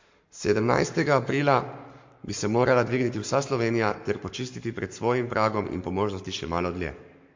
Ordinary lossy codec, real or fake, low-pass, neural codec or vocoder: MP3, 48 kbps; fake; 7.2 kHz; codec, 44.1 kHz, 7.8 kbps, Pupu-Codec